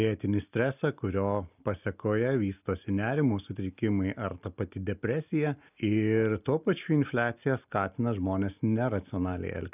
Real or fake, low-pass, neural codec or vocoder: real; 3.6 kHz; none